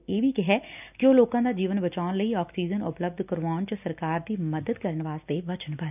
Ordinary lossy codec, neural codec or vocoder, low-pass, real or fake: none; none; 3.6 kHz; real